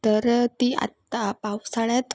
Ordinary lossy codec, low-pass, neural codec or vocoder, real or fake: none; none; none; real